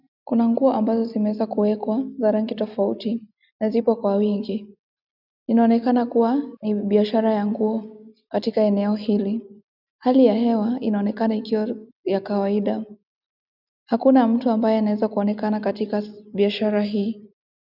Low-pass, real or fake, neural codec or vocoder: 5.4 kHz; real; none